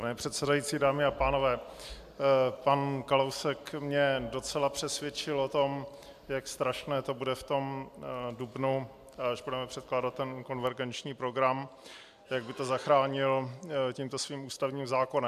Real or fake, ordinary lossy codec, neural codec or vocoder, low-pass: real; AAC, 96 kbps; none; 14.4 kHz